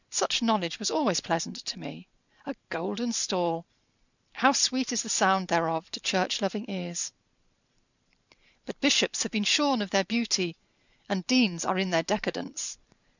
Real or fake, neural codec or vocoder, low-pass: fake; vocoder, 44.1 kHz, 128 mel bands every 512 samples, BigVGAN v2; 7.2 kHz